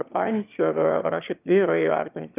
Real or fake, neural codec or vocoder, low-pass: fake; autoencoder, 22.05 kHz, a latent of 192 numbers a frame, VITS, trained on one speaker; 3.6 kHz